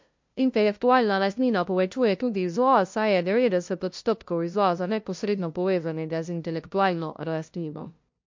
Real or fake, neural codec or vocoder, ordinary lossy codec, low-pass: fake; codec, 16 kHz, 0.5 kbps, FunCodec, trained on LibriTTS, 25 frames a second; MP3, 48 kbps; 7.2 kHz